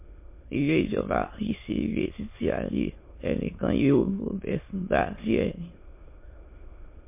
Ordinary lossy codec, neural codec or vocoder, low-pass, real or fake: MP3, 24 kbps; autoencoder, 22.05 kHz, a latent of 192 numbers a frame, VITS, trained on many speakers; 3.6 kHz; fake